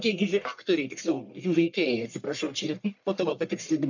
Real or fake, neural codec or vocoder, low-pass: fake; codec, 44.1 kHz, 1.7 kbps, Pupu-Codec; 7.2 kHz